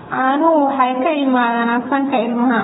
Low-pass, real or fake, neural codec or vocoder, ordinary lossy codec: 7.2 kHz; fake; codec, 16 kHz, 8 kbps, FreqCodec, smaller model; AAC, 16 kbps